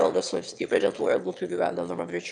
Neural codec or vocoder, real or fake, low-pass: autoencoder, 22.05 kHz, a latent of 192 numbers a frame, VITS, trained on one speaker; fake; 9.9 kHz